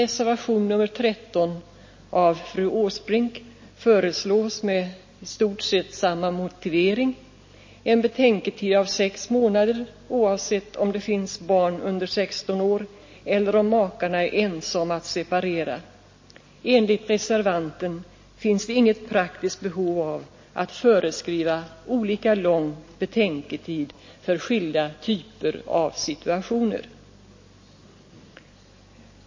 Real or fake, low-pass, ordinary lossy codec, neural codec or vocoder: real; 7.2 kHz; MP3, 32 kbps; none